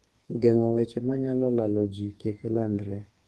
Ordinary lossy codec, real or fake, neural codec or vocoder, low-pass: Opus, 24 kbps; fake; codec, 32 kHz, 1.9 kbps, SNAC; 14.4 kHz